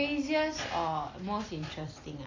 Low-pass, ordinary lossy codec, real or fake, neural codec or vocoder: 7.2 kHz; AAC, 32 kbps; real; none